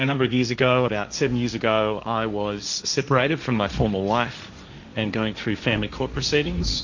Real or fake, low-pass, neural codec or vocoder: fake; 7.2 kHz; codec, 16 kHz, 1.1 kbps, Voila-Tokenizer